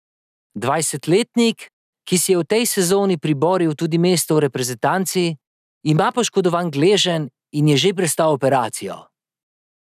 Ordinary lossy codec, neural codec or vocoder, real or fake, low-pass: none; none; real; 14.4 kHz